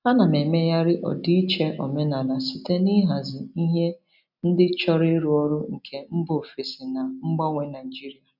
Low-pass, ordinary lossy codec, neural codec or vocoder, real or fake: 5.4 kHz; none; none; real